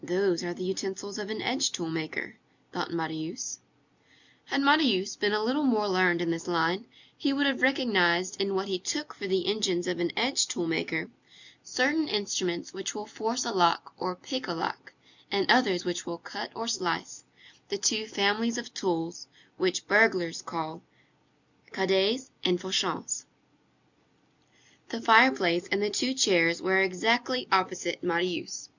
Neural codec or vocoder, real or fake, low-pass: none; real; 7.2 kHz